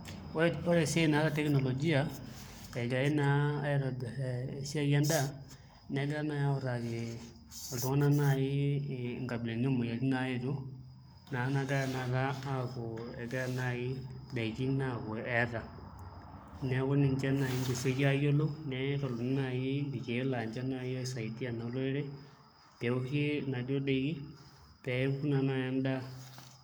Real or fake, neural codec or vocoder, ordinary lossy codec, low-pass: fake; codec, 44.1 kHz, 7.8 kbps, Pupu-Codec; none; none